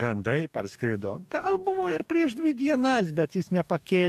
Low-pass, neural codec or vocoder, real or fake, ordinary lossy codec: 14.4 kHz; codec, 44.1 kHz, 2.6 kbps, DAC; fake; MP3, 96 kbps